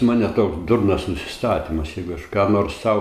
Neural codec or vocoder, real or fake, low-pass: none; real; 14.4 kHz